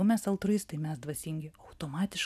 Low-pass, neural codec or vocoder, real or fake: 14.4 kHz; none; real